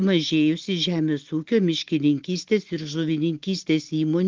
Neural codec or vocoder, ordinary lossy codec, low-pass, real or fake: none; Opus, 16 kbps; 7.2 kHz; real